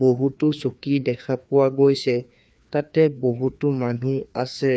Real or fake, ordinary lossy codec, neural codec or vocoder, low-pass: fake; none; codec, 16 kHz, 2 kbps, FreqCodec, larger model; none